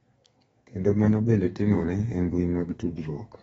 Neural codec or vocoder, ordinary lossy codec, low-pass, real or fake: codec, 32 kHz, 1.9 kbps, SNAC; AAC, 24 kbps; 14.4 kHz; fake